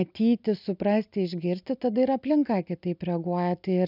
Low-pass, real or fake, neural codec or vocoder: 5.4 kHz; real; none